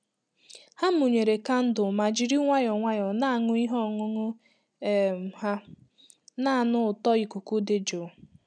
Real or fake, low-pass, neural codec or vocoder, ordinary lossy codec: real; 9.9 kHz; none; none